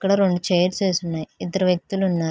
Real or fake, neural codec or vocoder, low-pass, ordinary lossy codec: real; none; none; none